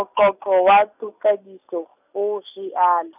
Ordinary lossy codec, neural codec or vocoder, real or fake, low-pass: none; none; real; 3.6 kHz